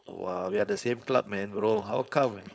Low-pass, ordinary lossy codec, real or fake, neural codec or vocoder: none; none; fake; codec, 16 kHz, 4.8 kbps, FACodec